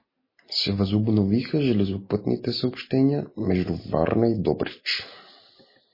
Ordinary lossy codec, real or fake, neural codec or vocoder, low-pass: MP3, 24 kbps; real; none; 5.4 kHz